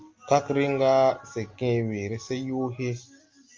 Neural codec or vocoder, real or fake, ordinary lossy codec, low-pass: none; real; Opus, 24 kbps; 7.2 kHz